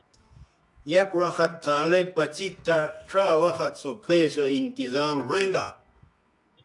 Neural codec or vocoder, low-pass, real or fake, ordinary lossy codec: codec, 24 kHz, 0.9 kbps, WavTokenizer, medium music audio release; 10.8 kHz; fake; AAC, 64 kbps